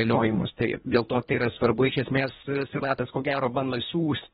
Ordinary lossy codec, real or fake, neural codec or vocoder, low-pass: AAC, 16 kbps; fake; codec, 24 kHz, 1.5 kbps, HILCodec; 10.8 kHz